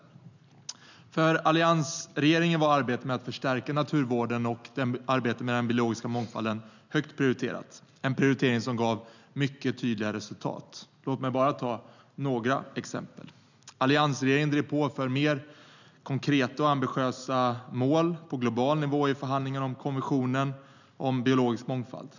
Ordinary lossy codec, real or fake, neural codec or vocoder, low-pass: none; real; none; 7.2 kHz